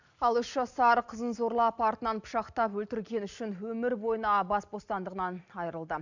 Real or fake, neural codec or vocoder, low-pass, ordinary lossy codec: real; none; 7.2 kHz; none